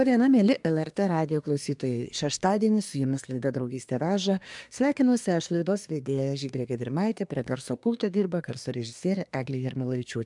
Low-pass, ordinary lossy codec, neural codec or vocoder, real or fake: 10.8 kHz; MP3, 96 kbps; codec, 24 kHz, 1 kbps, SNAC; fake